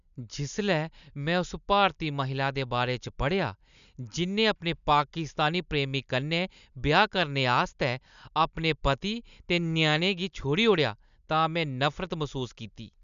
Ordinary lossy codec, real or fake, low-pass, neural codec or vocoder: none; real; 7.2 kHz; none